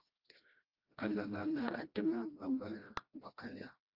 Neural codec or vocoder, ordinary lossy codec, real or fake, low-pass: codec, 16 kHz, 1 kbps, FreqCodec, smaller model; Opus, 24 kbps; fake; 5.4 kHz